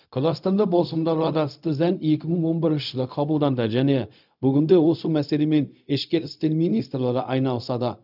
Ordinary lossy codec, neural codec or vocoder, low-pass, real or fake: none; codec, 16 kHz, 0.4 kbps, LongCat-Audio-Codec; 5.4 kHz; fake